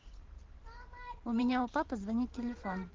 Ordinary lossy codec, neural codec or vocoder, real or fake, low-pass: Opus, 16 kbps; vocoder, 44.1 kHz, 128 mel bands every 512 samples, BigVGAN v2; fake; 7.2 kHz